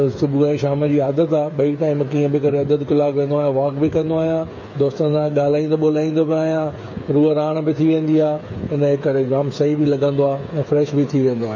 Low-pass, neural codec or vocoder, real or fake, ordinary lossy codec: 7.2 kHz; codec, 16 kHz, 8 kbps, FreqCodec, smaller model; fake; MP3, 32 kbps